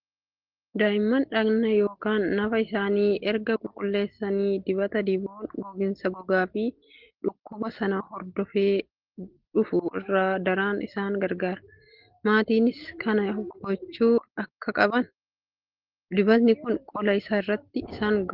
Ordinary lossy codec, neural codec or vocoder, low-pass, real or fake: Opus, 16 kbps; none; 5.4 kHz; real